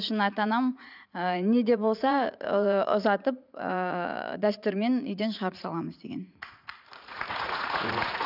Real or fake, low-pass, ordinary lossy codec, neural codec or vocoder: fake; 5.4 kHz; none; vocoder, 44.1 kHz, 80 mel bands, Vocos